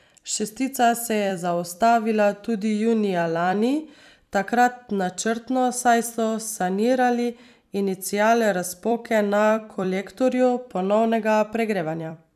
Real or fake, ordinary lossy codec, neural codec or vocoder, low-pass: fake; none; vocoder, 44.1 kHz, 128 mel bands every 256 samples, BigVGAN v2; 14.4 kHz